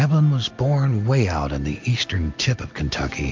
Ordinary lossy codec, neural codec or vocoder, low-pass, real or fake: MP3, 48 kbps; none; 7.2 kHz; real